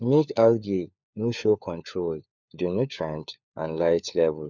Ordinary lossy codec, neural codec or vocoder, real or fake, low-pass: none; codec, 16 kHz, 4 kbps, FunCodec, trained on LibriTTS, 50 frames a second; fake; 7.2 kHz